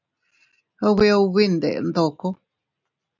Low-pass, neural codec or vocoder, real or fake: 7.2 kHz; none; real